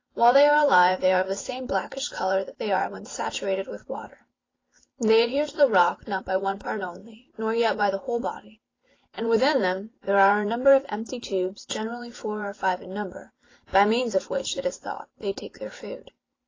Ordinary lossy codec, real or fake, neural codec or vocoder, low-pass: AAC, 32 kbps; fake; vocoder, 44.1 kHz, 128 mel bands every 512 samples, BigVGAN v2; 7.2 kHz